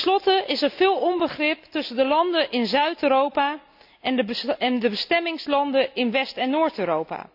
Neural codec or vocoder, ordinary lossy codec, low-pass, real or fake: none; none; 5.4 kHz; real